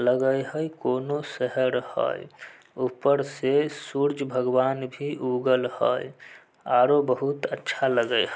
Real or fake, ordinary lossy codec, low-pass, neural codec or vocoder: real; none; none; none